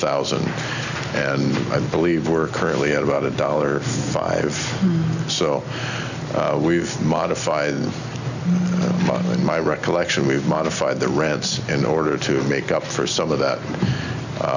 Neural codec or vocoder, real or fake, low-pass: none; real; 7.2 kHz